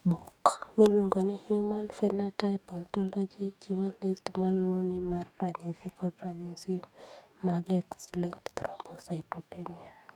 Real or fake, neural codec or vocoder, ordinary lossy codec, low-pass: fake; codec, 44.1 kHz, 2.6 kbps, DAC; none; 19.8 kHz